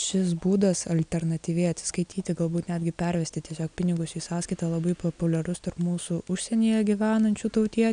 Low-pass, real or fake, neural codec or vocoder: 9.9 kHz; real; none